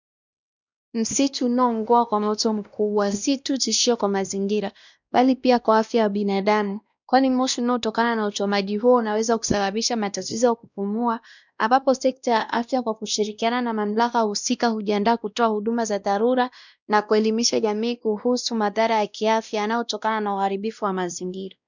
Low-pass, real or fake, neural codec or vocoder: 7.2 kHz; fake; codec, 16 kHz, 1 kbps, X-Codec, WavLM features, trained on Multilingual LibriSpeech